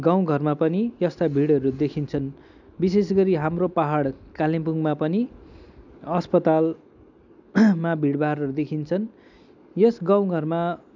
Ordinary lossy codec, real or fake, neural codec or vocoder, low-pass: none; real; none; 7.2 kHz